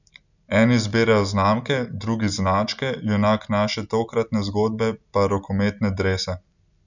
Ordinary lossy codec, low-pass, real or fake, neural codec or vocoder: none; 7.2 kHz; real; none